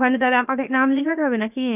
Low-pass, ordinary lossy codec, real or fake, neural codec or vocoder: 3.6 kHz; none; fake; codec, 16 kHz, 0.7 kbps, FocalCodec